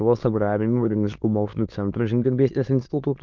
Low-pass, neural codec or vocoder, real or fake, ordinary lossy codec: 7.2 kHz; autoencoder, 22.05 kHz, a latent of 192 numbers a frame, VITS, trained on many speakers; fake; Opus, 32 kbps